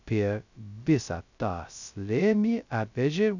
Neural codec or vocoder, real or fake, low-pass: codec, 16 kHz, 0.2 kbps, FocalCodec; fake; 7.2 kHz